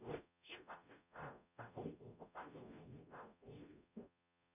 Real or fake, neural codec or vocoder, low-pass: fake; codec, 44.1 kHz, 0.9 kbps, DAC; 3.6 kHz